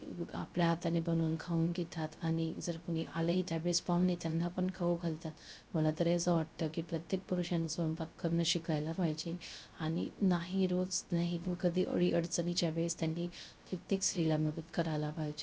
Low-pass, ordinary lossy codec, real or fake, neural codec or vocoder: none; none; fake; codec, 16 kHz, 0.3 kbps, FocalCodec